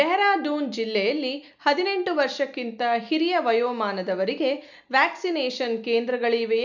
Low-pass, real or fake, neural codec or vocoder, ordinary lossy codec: 7.2 kHz; real; none; none